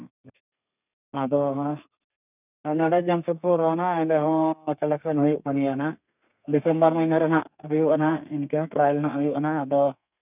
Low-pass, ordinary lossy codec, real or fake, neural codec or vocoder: 3.6 kHz; none; fake; codec, 32 kHz, 1.9 kbps, SNAC